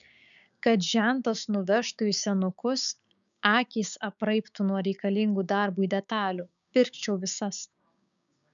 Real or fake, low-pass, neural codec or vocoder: fake; 7.2 kHz; codec, 16 kHz, 6 kbps, DAC